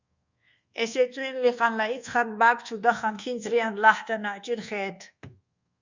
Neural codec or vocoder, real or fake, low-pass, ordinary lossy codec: codec, 24 kHz, 1.2 kbps, DualCodec; fake; 7.2 kHz; Opus, 64 kbps